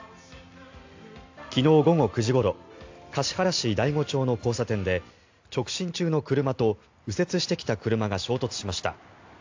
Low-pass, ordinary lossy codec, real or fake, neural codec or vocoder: 7.2 kHz; AAC, 48 kbps; real; none